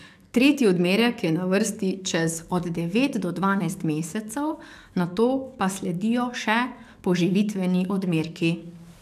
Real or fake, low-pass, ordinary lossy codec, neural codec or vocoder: fake; 14.4 kHz; none; codec, 44.1 kHz, 7.8 kbps, DAC